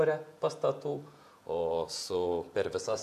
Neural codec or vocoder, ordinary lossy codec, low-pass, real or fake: vocoder, 48 kHz, 128 mel bands, Vocos; AAC, 96 kbps; 14.4 kHz; fake